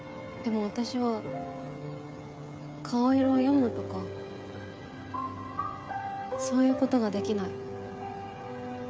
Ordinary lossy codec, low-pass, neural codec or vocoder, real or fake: none; none; codec, 16 kHz, 16 kbps, FreqCodec, smaller model; fake